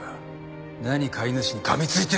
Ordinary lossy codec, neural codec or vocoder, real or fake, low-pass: none; none; real; none